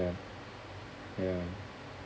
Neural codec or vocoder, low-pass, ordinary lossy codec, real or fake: none; none; none; real